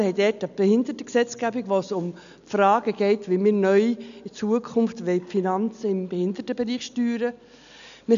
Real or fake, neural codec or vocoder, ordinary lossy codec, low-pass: real; none; none; 7.2 kHz